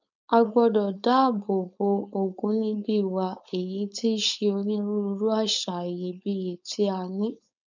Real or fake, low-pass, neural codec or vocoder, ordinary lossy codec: fake; 7.2 kHz; codec, 16 kHz, 4.8 kbps, FACodec; none